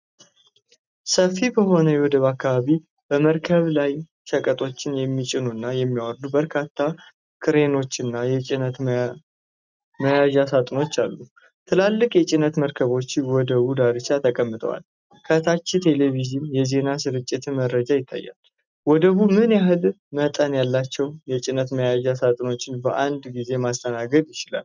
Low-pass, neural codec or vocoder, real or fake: 7.2 kHz; none; real